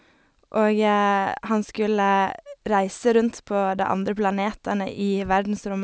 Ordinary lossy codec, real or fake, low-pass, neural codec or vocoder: none; real; none; none